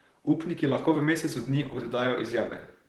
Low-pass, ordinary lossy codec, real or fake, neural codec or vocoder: 19.8 kHz; Opus, 16 kbps; fake; vocoder, 44.1 kHz, 128 mel bands, Pupu-Vocoder